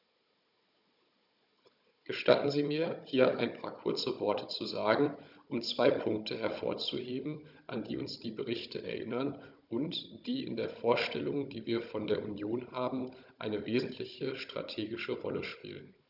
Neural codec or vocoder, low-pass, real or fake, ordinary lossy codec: codec, 16 kHz, 16 kbps, FunCodec, trained on Chinese and English, 50 frames a second; 5.4 kHz; fake; none